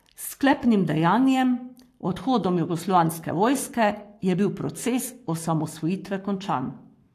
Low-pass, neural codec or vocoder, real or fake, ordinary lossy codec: 14.4 kHz; codec, 44.1 kHz, 7.8 kbps, Pupu-Codec; fake; AAC, 64 kbps